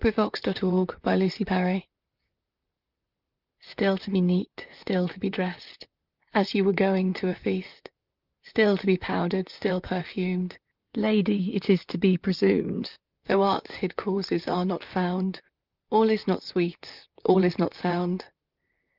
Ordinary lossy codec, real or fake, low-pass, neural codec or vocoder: Opus, 24 kbps; fake; 5.4 kHz; vocoder, 44.1 kHz, 128 mel bands, Pupu-Vocoder